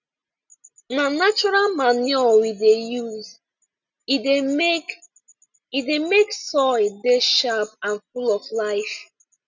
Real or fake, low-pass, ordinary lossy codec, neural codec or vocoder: real; 7.2 kHz; none; none